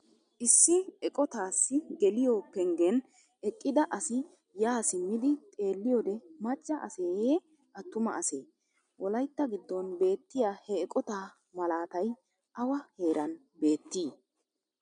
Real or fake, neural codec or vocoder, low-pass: real; none; 9.9 kHz